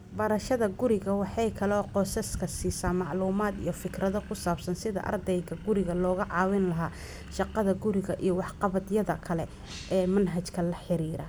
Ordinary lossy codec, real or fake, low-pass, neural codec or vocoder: none; real; none; none